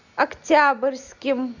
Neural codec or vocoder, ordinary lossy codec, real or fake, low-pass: none; Opus, 64 kbps; real; 7.2 kHz